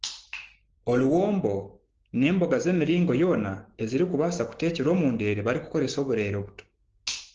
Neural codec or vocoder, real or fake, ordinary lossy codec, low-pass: codec, 16 kHz, 6 kbps, DAC; fake; Opus, 16 kbps; 7.2 kHz